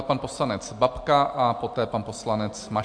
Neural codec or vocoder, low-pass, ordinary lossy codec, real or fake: none; 9.9 kHz; MP3, 64 kbps; real